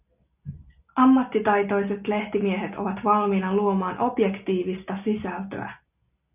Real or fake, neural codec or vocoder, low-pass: real; none; 3.6 kHz